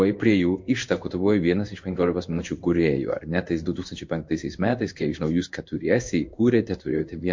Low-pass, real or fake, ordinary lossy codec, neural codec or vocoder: 7.2 kHz; fake; MP3, 48 kbps; codec, 16 kHz in and 24 kHz out, 1 kbps, XY-Tokenizer